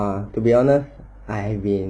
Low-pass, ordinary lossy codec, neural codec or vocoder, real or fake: 9.9 kHz; none; none; real